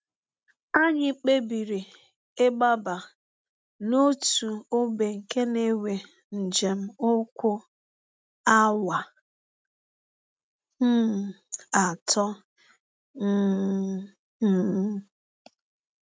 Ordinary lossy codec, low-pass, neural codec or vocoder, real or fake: none; none; none; real